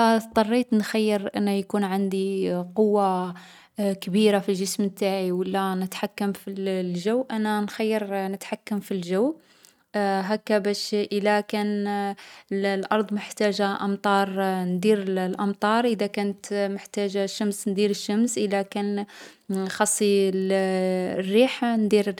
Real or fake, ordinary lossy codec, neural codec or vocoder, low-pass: real; none; none; 19.8 kHz